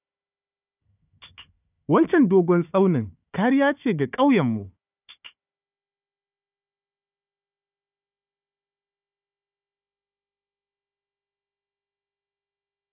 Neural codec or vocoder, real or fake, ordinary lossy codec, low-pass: codec, 16 kHz, 4 kbps, FunCodec, trained on Chinese and English, 50 frames a second; fake; none; 3.6 kHz